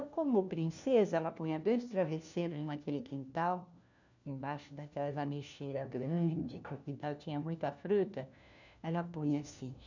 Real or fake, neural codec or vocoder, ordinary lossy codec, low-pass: fake; codec, 16 kHz, 1 kbps, FunCodec, trained on LibriTTS, 50 frames a second; none; 7.2 kHz